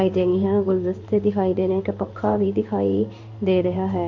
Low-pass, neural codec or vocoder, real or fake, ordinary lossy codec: 7.2 kHz; codec, 16 kHz in and 24 kHz out, 1 kbps, XY-Tokenizer; fake; MP3, 48 kbps